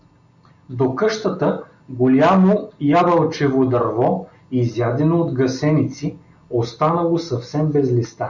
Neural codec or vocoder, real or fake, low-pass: none; real; 7.2 kHz